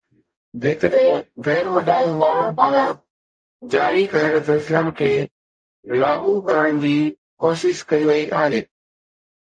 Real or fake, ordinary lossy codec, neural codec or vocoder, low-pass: fake; MP3, 48 kbps; codec, 44.1 kHz, 0.9 kbps, DAC; 9.9 kHz